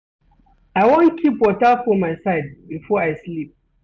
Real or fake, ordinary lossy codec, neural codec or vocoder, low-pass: real; none; none; none